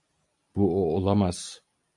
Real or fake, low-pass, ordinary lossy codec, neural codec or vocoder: real; 10.8 kHz; MP3, 96 kbps; none